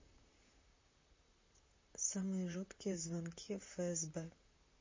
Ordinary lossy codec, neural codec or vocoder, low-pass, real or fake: MP3, 32 kbps; vocoder, 44.1 kHz, 128 mel bands, Pupu-Vocoder; 7.2 kHz; fake